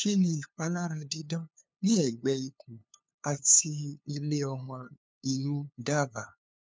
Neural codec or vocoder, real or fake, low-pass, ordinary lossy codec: codec, 16 kHz, 2 kbps, FunCodec, trained on LibriTTS, 25 frames a second; fake; none; none